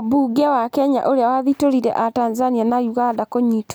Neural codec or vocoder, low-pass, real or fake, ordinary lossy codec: none; none; real; none